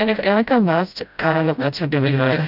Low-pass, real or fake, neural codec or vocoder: 5.4 kHz; fake; codec, 16 kHz, 0.5 kbps, FreqCodec, smaller model